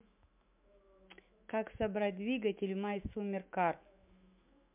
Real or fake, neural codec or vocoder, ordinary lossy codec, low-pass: fake; autoencoder, 48 kHz, 128 numbers a frame, DAC-VAE, trained on Japanese speech; MP3, 32 kbps; 3.6 kHz